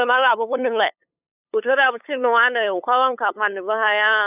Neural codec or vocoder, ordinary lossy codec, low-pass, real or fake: codec, 16 kHz, 8 kbps, FunCodec, trained on LibriTTS, 25 frames a second; none; 3.6 kHz; fake